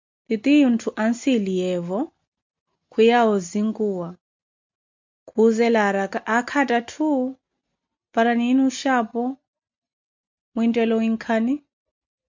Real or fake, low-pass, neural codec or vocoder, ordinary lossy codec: real; 7.2 kHz; none; MP3, 64 kbps